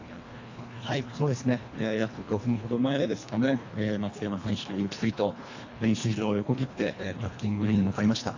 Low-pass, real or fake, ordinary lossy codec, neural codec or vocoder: 7.2 kHz; fake; AAC, 48 kbps; codec, 24 kHz, 1.5 kbps, HILCodec